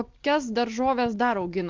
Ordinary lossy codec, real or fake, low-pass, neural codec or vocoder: Opus, 32 kbps; real; 7.2 kHz; none